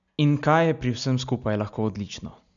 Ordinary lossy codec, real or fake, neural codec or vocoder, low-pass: none; real; none; 7.2 kHz